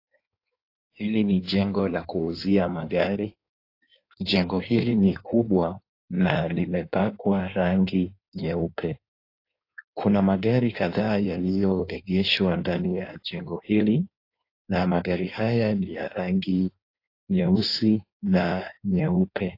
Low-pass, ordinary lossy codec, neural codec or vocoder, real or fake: 5.4 kHz; AAC, 32 kbps; codec, 16 kHz in and 24 kHz out, 1.1 kbps, FireRedTTS-2 codec; fake